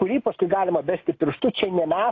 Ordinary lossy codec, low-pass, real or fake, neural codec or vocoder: AAC, 32 kbps; 7.2 kHz; real; none